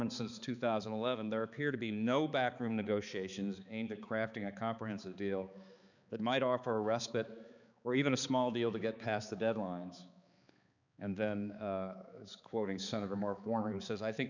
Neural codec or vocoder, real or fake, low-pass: codec, 16 kHz, 4 kbps, X-Codec, HuBERT features, trained on balanced general audio; fake; 7.2 kHz